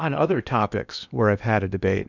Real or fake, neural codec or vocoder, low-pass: fake; codec, 16 kHz in and 24 kHz out, 0.8 kbps, FocalCodec, streaming, 65536 codes; 7.2 kHz